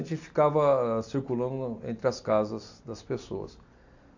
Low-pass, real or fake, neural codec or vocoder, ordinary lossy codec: 7.2 kHz; real; none; AAC, 48 kbps